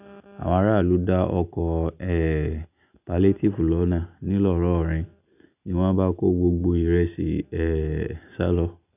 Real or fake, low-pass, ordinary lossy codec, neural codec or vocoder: real; 3.6 kHz; none; none